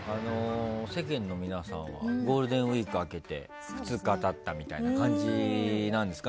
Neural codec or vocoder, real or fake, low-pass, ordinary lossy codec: none; real; none; none